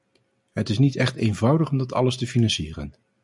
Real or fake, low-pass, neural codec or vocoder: real; 10.8 kHz; none